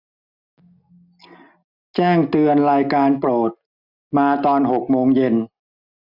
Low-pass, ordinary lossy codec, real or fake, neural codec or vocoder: 5.4 kHz; none; real; none